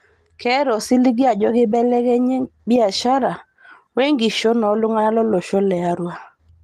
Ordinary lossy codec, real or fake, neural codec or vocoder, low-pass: Opus, 24 kbps; real; none; 14.4 kHz